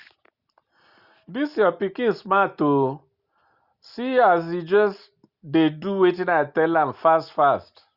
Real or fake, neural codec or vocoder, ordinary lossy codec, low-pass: real; none; Opus, 64 kbps; 5.4 kHz